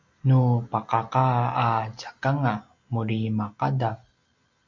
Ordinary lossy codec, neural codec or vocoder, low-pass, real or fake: MP3, 48 kbps; none; 7.2 kHz; real